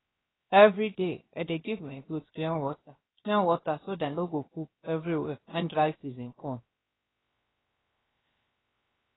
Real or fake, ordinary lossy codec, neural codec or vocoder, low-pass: fake; AAC, 16 kbps; codec, 16 kHz, 0.7 kbps, FocalCodec; 7.2 kHz